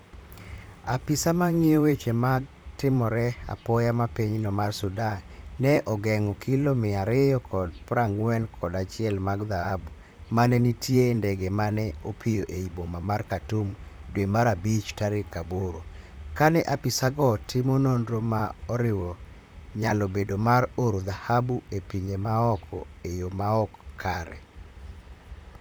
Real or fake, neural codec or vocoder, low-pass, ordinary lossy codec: fake; vocoder, 44.1 kHz, 128 mel bands, Pupu-Vocoder; none; none